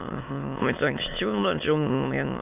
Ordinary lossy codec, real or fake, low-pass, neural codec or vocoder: none; fake; 3.6 kHz; autoencoder, 22.05 kHz, a latent of 192 numbers a frame, VITS, trained on many speakers